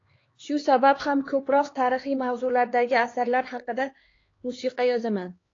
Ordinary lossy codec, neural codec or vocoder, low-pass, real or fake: AAC, 32 kbps; codec, 16 kHz, 2 kbps, X-Codec, HuBERT features, trained on LibriSpeech; 7.2 kHz; fake